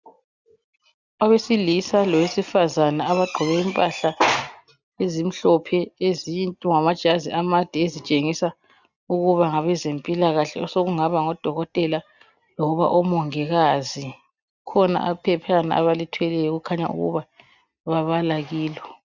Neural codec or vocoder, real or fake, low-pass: none; real; 7.2 kHz